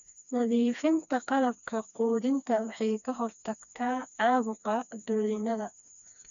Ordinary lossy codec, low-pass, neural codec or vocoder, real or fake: none; 7.2 kHz; codec, 16 kHz, 2 kbps, FreqCodec, smaller model; fake